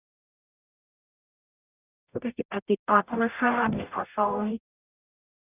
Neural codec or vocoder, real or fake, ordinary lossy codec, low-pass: codec, 44.1 kHz, 0.9 kbps, DAC; fake; none; 3.6 kHz